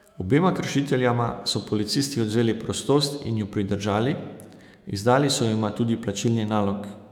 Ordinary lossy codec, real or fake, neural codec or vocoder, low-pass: none; fake; autoencoder, 48 kHz, 128 numbers a frame, DAC-VAE, trained on Japanese speech; 19.8 kHz